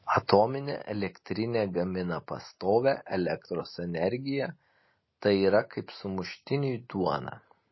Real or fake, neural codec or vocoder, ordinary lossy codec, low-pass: real; none; MP3, 24 kbps; 7.2 kHz